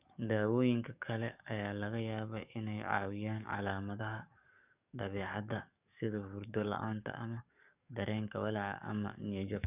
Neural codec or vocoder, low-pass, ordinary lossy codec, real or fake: codec, 44.1 kHz, 7.8 kbps, DAC; 3.6 kHz; none; fake